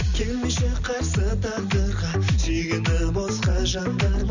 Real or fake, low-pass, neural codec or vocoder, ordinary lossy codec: real; 7.2 kHz; none; none